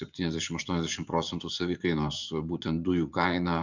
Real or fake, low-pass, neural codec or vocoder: fake; 7.2 kHz; vocoder, 44.1 kHz, 80 mel bands, Vocos